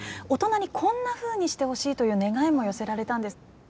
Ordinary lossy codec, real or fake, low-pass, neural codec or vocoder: none; real; none; none